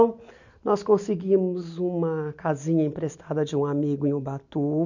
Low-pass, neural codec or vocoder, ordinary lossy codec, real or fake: 7.2 kHz; none; none; real